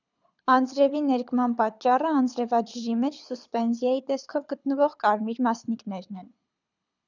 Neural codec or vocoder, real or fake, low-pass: codec, 24 kHz, 6 kbps, HILCodec; fake; 7.2 kHz